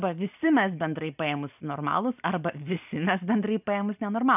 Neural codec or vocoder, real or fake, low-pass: none; real; 3.6 kHz